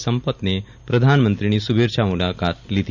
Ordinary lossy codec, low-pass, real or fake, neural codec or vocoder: none; 7.2 kHz; real; none